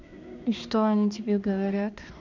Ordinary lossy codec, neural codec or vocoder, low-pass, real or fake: none; codec, 16 kHz, 2 kbps, X-Codec, HuBERT features, trained on balanced general audio; 7.2 kHz; fake